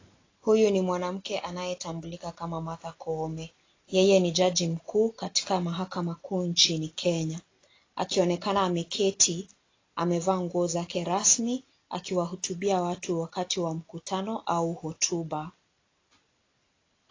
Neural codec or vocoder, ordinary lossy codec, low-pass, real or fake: none; AAC, 32 kbps; 7.2 kHz; real